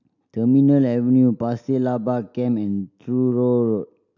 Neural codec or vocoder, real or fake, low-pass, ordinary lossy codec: none; real; 7.2 kHz; none